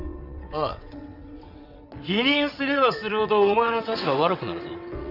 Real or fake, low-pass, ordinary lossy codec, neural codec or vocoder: fake; 5.4 kHz; none; vocoder, 22.05 kHz, 80 mel bands, WaveNeXt